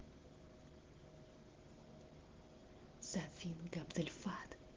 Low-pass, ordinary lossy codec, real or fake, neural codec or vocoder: 7.2 kHz; Opus, 16 kbps; real; none